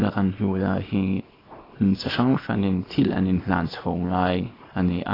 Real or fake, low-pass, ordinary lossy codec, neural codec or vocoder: fake; 5.4 kHz; AAC, 24 kbps; codec, 24 kHz, 0.9 kbps, WavTokenizer, small release